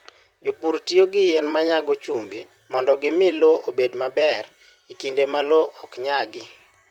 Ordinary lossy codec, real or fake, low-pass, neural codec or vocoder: Opus, 64 kbps; fake; 19.8 kHz; vocoder, 44.1 kHz, 128 mel bands, Pupu-Vocoder